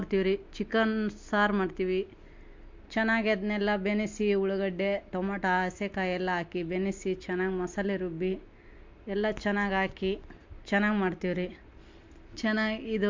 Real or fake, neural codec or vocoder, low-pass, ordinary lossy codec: real; none; 7.2 kHz; MP3, 48 kbps